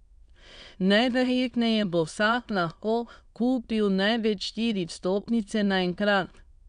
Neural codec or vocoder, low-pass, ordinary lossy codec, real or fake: autoencoder, 22.05 kHz, a latent of 192 numbers a frame, VITS, trained on many speakers; 9.9 kHz; none; fake